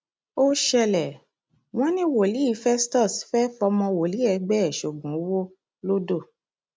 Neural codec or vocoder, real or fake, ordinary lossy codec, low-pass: none; real; none; none